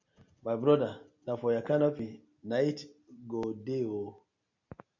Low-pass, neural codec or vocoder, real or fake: 7.2 kHz; none; real